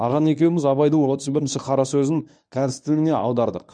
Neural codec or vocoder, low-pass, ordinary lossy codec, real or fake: codec, 24 kHz, 0.9 kbps, WavTokenizer, medium speech release version 1; 9.9 kHz; none; fake